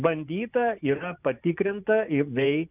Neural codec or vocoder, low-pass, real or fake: vocoder, 24 kHz, 100 mel bands, Vocos; 3.6 kHz; fake